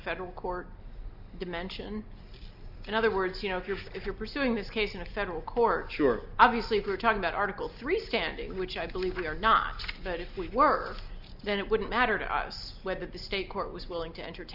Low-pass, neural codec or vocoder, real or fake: 5.4 kHz; none; real